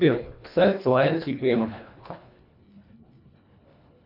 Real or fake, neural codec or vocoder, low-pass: fake; codec, 24 kHz, 1.5 kbps, HILCodec; 5.4 kHz